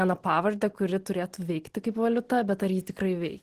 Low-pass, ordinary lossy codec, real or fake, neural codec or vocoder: 14.4 kHz; Opus, 16 kbps; real; none